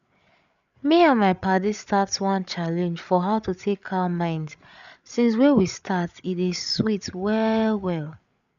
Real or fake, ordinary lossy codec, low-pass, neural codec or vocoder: fake; none; 7.2 kHz; codec, 16 kHz, 8 kbps, FreqCodec, larger model